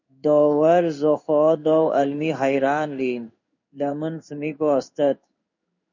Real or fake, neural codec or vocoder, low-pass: fake; codec, 16 kHz in and 24 kHz out, 1 kbps, XY-Tokenizer; 7.2 kHz